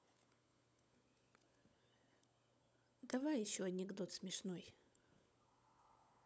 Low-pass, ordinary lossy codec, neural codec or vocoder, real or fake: none; none; codec, 16 kHz, 16 kbps, FunCodec, trained on LibriTTS, 50 frames a second; fake